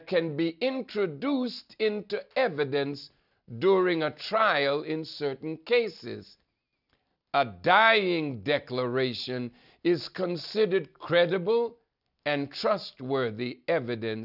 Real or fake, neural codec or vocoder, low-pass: real; none; 5.4 kHz